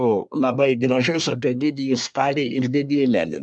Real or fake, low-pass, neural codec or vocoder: fake; 9.9 kHz; codec, 24 kHz, 1 kbps, SNAC